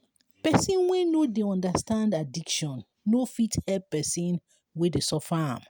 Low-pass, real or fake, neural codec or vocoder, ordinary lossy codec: none; real; none; none